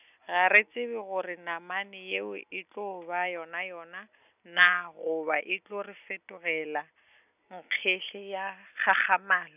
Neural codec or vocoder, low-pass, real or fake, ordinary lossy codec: none; 3.6 kHz; real; none